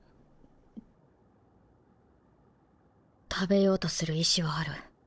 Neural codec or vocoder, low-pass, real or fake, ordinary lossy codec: codec, 16 kHz, 16 kbps, FunCodec, trained on Chinese and English, 50 frames a second; none; fake; none